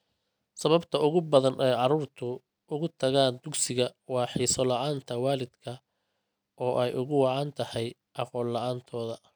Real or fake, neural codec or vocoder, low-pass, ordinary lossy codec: real; none; none; none